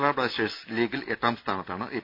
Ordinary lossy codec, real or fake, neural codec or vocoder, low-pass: none; real; none; 5.4 kHz